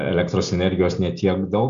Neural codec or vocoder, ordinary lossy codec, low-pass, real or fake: none; AAC, 96 kbps; 7.2 kHz; real